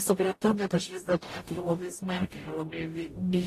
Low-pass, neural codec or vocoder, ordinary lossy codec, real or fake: 14.4 kHz; codec, 44.1 kHz, 0.9 kbps, DAC; AAC, 48 kbps; fake